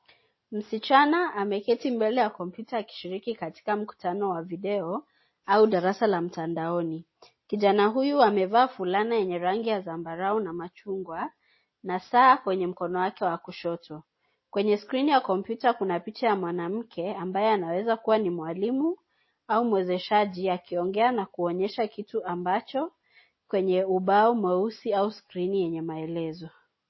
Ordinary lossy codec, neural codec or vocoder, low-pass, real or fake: MP3, 24 kbps; none; 7.2 kHz; real